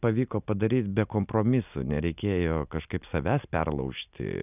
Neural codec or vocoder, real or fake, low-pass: none; real; 3.6 kHz